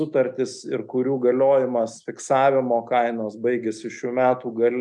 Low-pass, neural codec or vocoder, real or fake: 10.8 kHz; none; real